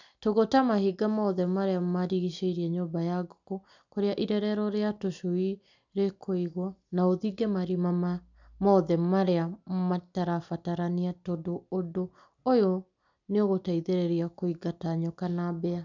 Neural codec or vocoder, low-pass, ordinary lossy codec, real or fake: none; 7.2 kHz; none; real